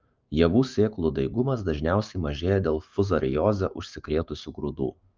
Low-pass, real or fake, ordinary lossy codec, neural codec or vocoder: 7.2 kHz; fake; Opus, 24 kbps; autoencoder, 48 kHz, 128 numbers a frame, DAC-VAE, trained on Japanese speech